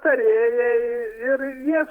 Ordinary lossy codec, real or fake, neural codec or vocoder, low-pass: Opus, 32 kbps; fake; vocoder, 48 kHz, 128 mel bands, Vocos; 19.8 kHz